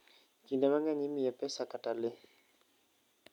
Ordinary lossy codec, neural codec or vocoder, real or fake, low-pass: none; none; real; 19.8 kHz